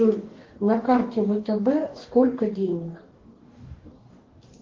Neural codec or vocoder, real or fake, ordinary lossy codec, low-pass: codec, 44.1 kHz, 2.6 kbps, DAC; fake; Opus, 16 kbps; 7.2 kHz